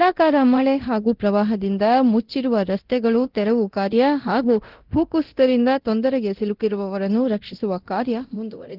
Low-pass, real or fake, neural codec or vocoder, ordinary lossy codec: 5.4 kHz; fake; codec, 24 kHz, 0.9 kbps, DualCodec; Opus, 16 kbps